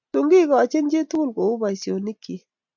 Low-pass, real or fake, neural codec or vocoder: 7.2 kHz; real; none